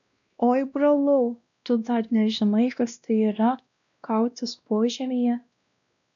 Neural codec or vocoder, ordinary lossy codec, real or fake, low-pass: codec, 16 kHz, 1 kbps, X-Codec, WavLM features, trained on Multilingual LibriSpeech; MP3, 96 kbps; fake; 7.2 kHz